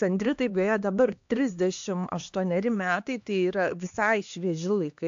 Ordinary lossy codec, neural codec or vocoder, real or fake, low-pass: AAC, 64 kbps; codec, 16 kHz, 2 kbps, X-Codec, HuBERT features, trained on LibriSpeech; fake; 7.2 kHz